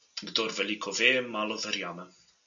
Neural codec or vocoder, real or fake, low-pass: none; real; 7.2 kHz